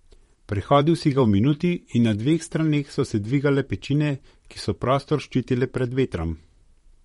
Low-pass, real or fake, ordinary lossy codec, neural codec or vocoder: 19.8 kHz; fake; MP3, 48 kbps; vocoder, 44.1 kHz, 128 mel bands, Pupu-Vocoder